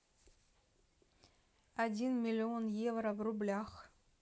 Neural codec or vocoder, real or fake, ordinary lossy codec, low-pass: none; real; none; none